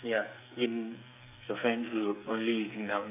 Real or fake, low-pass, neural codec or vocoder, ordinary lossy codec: fake; 3.6 kHz; codec, 44.1 kHz, 2.6 kbps, SNAC; none